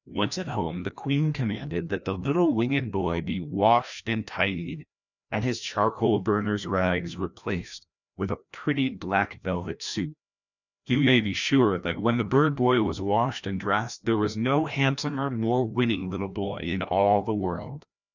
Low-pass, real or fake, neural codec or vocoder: 7.2 kHz; fake; codec, 16 kHz, 1 kbps, FreqCodec, larger model